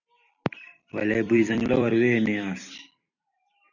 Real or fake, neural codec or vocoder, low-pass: fake; vocoder, 44.1 kHz, 128 mel bands every 256 samples, BigVGAN v2; 7.2 kHz